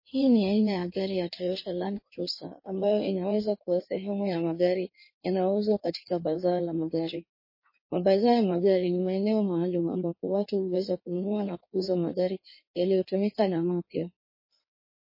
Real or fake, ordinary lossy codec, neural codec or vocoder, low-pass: fake; MP3, 24 kbps; codec, 16 kHz in and 24 kHz out, 1.1 kbps, FireRedTTS-2 codec; 5.4 kHz